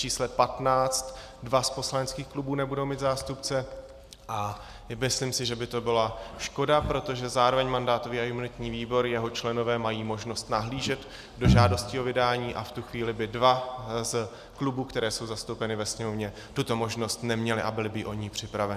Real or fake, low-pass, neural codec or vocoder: real; 14.4 kHz; none